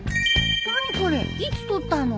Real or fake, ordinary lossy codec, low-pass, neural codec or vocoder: real; none; none; none